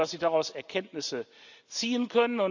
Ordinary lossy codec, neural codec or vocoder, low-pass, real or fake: none; none; 7.2 kHz; real